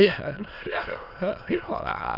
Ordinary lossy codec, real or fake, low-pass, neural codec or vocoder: none; fake; 5.4 kHz; autoencoder, 22.05 kHz, a latent of 192 numbers a frame, VITS, trained on many speakers